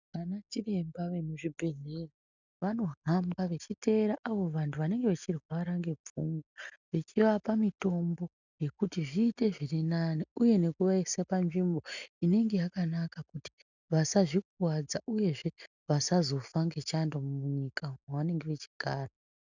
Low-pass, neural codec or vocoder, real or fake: 7.2 kHz; none; real